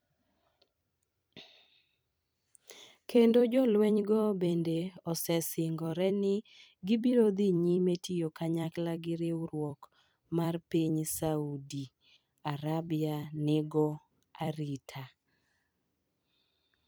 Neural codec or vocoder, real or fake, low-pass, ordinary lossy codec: vocoder, 44.1 kHz, 128 mel bands every 256 samples, BigVGAN v2; fake; none; none